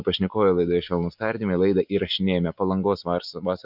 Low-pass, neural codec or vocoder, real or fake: 5.4 kHz; none; real